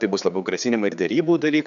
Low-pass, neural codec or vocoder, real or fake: 7.2 kHz; codec, 16 kHz, 4 kbps, X-Codec, HuBERT features, trained on general audio; fake